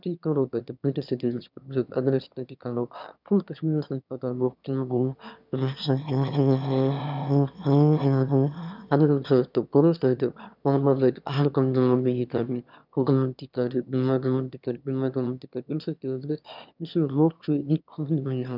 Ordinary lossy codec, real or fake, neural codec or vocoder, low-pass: none; fake; autoencoder, 22.05 kHz, a latent of 192 numbers a frame, VITS, trained on one speaker; 5.4 kHz